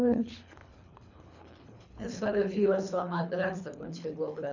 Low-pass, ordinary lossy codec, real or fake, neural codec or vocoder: 7.2 kHz; none; fake; codec, 24 kHz, 3 kbps, HILCodec